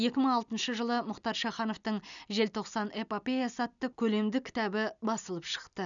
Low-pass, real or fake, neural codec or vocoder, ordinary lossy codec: 7.2 kHz; real; none; none